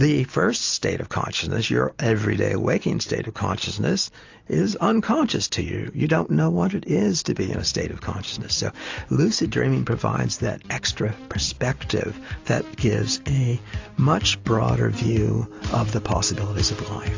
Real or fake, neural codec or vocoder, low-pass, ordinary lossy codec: real; none; 7.2 kHz; AAC, 48 kbps